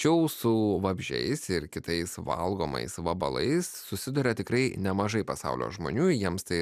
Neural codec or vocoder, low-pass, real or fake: none; 14.4 kHz; real